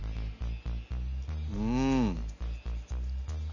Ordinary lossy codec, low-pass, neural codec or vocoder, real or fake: none; 7.2 kHz; none; real